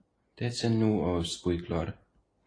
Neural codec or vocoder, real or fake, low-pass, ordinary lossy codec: none; real; 9.9 kHz; AAC, 32 kbps